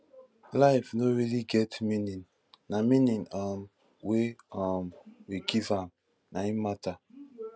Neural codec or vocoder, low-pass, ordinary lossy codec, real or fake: none; none; none; real